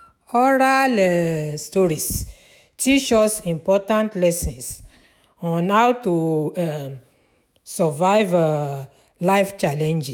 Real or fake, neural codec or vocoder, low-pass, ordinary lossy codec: fake; autoencoder, 48 kHz, 128 numbers a frame, DAC-VAE, trained on Japanese speech; none; none